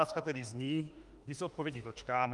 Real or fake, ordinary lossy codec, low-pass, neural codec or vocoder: fake; Opus, 32 kbps; 10.8 kHz; autoencoder, 48 kHz, 32 numbers a frame, DAC-VAE, trained on Japanese speech